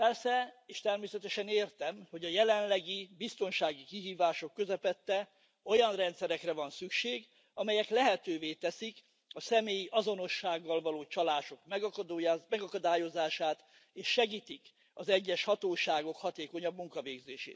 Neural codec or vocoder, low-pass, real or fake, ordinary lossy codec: none; none; real; none